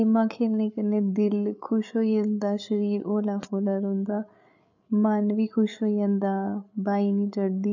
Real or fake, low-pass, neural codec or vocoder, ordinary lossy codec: fake; 7.2 kHz; codec, 16 kHz, 8 kbps, FreqCodec, larger model; none